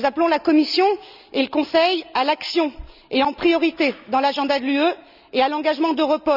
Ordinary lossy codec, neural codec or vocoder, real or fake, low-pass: none; none; real; 5.4 kHz